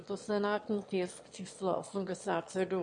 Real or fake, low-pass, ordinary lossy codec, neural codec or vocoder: fake; 9.9 kHz; MP3, 48 kbps; autoencoder, 22.05 kHz, a latent of 192 numbers a frame, VITS, trained on one speaker